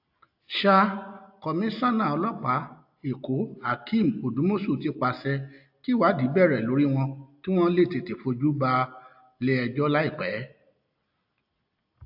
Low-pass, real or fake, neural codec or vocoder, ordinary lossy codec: 5.4 kHz; real; none; AAC, 48 kbps